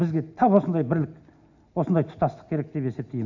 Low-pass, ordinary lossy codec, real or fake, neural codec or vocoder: 7.2 kHz; none; real; none